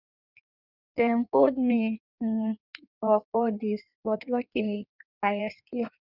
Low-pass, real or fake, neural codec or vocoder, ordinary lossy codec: 5.4 kHz; fake; codec, 16 kHz in and 24 kHz out, 1.1 kbps, FireRedTTS-2 codec; none